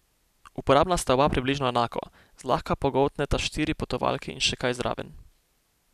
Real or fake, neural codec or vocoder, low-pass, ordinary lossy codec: real; none; 14.4 kHz; none